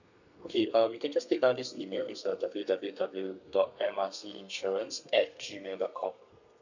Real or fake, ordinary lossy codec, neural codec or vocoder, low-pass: fake; none; codec, 32 kHz, 1.9 kbps, SNAC; 7.2 kHz